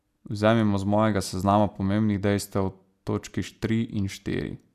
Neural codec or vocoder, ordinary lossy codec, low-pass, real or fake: none; AAC, 96 kbps; 14.4 kHz; real